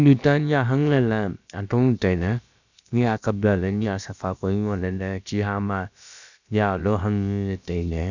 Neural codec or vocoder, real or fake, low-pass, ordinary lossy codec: codec, 16 kHz, about 1 kbps, DyCAST, with the encoder's durations; fake; 7.2 kHz; none